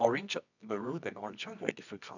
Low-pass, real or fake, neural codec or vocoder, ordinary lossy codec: 7.2 kHz; fake; codec, 24 kHz, 0.9 kbps, WavTokenizer, medium music audio release; none